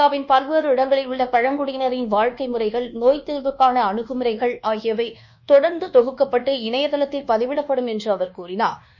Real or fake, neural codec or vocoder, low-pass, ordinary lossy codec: fake; codec, 24 kHz, 1.2 kbps, DualCodec; 7.2 kHz; none